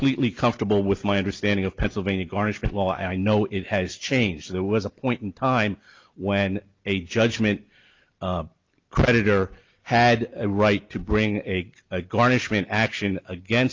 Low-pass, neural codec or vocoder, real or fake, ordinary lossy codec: 7.2 kHz; none; real; Opus, 32 kbps